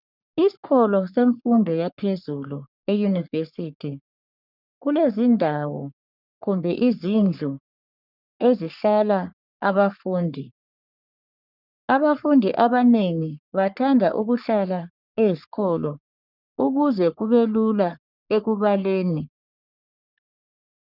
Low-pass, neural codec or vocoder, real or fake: 5.4 kHz; codec, 44.1 kHz, 3.4 kbps, Pupu-Codec; fake